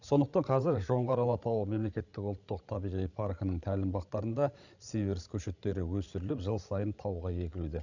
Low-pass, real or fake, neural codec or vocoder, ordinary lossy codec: 7.2 kHz; fake; codec, 16 kHz, 16 kbps, FreqCodec, larger model; none